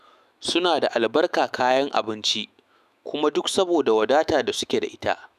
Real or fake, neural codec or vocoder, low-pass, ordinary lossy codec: fake; autoencoder, 48 kHz, 128 numbers a frame, DAC-VAE, trained on Japanese speech; 14.4 kHz; none